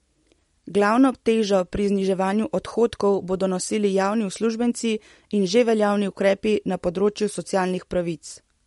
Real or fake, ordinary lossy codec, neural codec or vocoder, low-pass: real; MP3, 48 kbps; none; 19.8 kHz